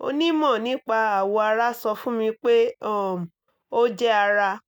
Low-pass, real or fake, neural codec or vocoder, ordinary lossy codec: 19.8 kHz; real; none; none